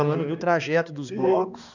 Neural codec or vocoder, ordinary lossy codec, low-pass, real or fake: codec, 16 kHz, 2 kbps, X-Codec, HuBERT features, trained on balanced general audio; none; 7.2 kHz; fake